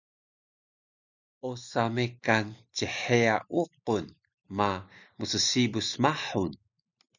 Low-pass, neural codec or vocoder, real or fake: 7.2 kHz; none; real